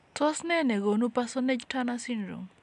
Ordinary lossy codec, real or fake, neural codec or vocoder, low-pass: none; real; none; 10.8 kHz